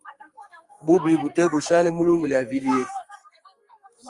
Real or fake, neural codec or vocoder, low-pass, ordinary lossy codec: fake; codec, 44.1 kHz, 2.6 kbps, SNAC; 10.8 kHz; Opus, 32 kbps